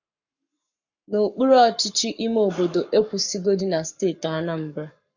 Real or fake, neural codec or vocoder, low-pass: fake; codec, 44.1 kHz, 7.8 kbps, Pupu-Codec; 7.2 kHz